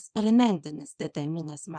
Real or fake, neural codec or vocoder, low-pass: fake; codec, 24 kHz, 0.9 kbps, WavTokenizer, small release; 9.9 kHz